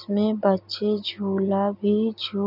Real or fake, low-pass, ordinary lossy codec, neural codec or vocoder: real; 5.4 kHz; none; none